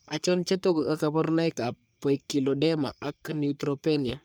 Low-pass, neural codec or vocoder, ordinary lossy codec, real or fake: none; codec, 44.1 kHz, 3.4 kbps, Pupu-Codec; none; fake